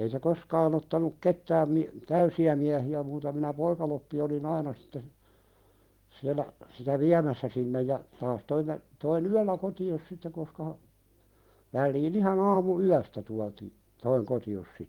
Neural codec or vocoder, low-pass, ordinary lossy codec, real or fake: vocoder, 44.1 kHz, 128 mel bands every 512 samples, BigVGAN v2; 19.8 kHz; Opus, 24 kbps; fake